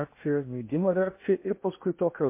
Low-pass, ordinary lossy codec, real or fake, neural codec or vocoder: 3.6 kHz; MP3, 24 kbps; fake; codec, 16 kHz in and 24 kHz out, 0.6 kbps, FocalCodec, streaming, 2048 codes